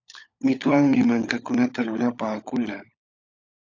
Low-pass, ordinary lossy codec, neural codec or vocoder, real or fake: 7.2 kHz; AAC, 48 kbps; codec, 16 kHz, 16 kbps, FunCodec, trained on LibriTTS, 50 frames a second; fake